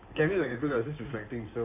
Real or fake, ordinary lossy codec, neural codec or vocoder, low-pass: fake; AAC, 24 kbps; codec, 16 kHz in and 24 kHz out, 2.2 kbps, FireRedTTS-2 codec; 3.6 kHz